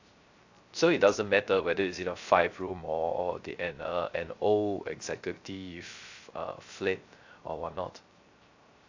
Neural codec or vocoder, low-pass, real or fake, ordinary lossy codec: codec, 16 kHz, 0.3 kbps, FocalCodec; 7.2 kHz; fake; AAC, 48 kbps